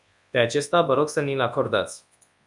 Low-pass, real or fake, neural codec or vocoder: 10.8 kHz; fake; codec, 24 kHz, 0.9 kbps, WavTokenizer, large speech release